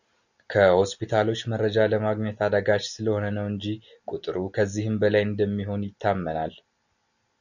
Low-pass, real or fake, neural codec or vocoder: 7.2 kHz; real; none